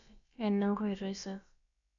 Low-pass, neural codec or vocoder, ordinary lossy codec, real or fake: 7.2 kHz; codec, 16 kHz, about 1 kbps, DyCAST, with the encoder's durations; MP3, 96 kbps; fake